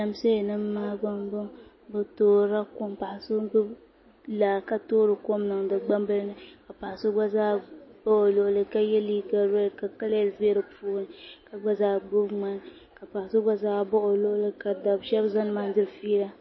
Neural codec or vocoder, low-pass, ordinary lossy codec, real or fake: none; 7.2 kHz; MP3, 24 kbps; real